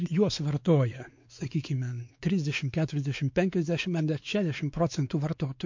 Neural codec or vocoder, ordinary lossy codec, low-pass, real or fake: codec, 16 kHz, 4 kbps, X-Codec, WavLM features, trained on Multilingual LibriSpeech; MP3, 48 kbps; 7.2 kHz; fake